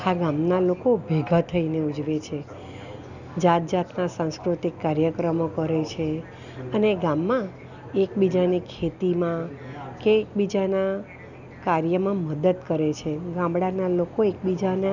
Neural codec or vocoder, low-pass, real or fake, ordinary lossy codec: none; 7.2 kHz; real; none